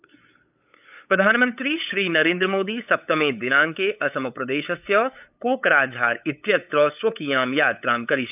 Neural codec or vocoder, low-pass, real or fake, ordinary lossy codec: codec, 16 kHz, 8 kbps, FunCodec, trained on LibriTTS, 25 frames a second; 3.6 kHz; fake; none